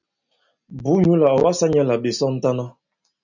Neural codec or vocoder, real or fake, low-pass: none; real; 7.2 kHz